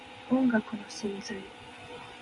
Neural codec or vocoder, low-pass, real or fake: none; 10.8 kHz; real